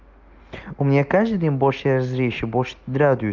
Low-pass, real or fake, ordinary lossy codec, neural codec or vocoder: 7.2 kHz; real; Opus, 32 kbps; none